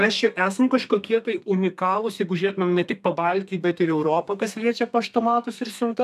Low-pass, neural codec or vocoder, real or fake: 14.4 kHz; codec, 32 kHz, 1.9 kbps, SNAC; fake